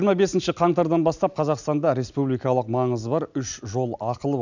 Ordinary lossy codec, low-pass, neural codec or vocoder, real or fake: none; 7.2 kHz; none; real